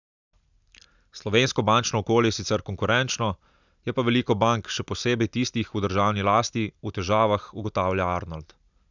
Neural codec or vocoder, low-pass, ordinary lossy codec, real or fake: none; 7.2 kHz; none; real